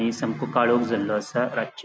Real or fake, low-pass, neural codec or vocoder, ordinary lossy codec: real; none; none; none